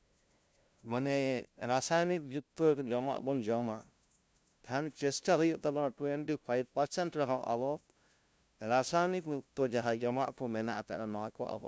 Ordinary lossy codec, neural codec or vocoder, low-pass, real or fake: none; codec, 16 kHz, 0.5 kbps, FunCodec, trained on LibriTTS, 25 frames a second; none; fake